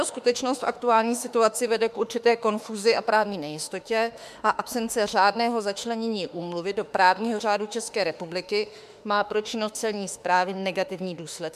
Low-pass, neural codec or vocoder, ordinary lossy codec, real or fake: 14.4 kHz; autoencoder, 48 kHz, 32 numbers a frame, DAC-VAE, trained on Japanese speech; MP3, 96 kbps; fake